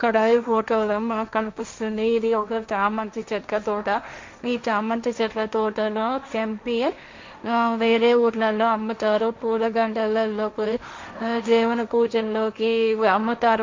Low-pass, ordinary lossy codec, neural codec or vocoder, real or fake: 7.2 kHz; MP3, 48 kbps; codec, 16 kHz, 1.1 kbps, Voila-Tokenizer; fake